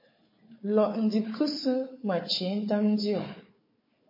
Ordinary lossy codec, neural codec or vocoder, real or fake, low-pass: MP3, 24 kbps; codec, 16 kHz, 4 kbps, FunCodec, trained on Chinese and English, 50 frames a second; fake; 5.4 kHz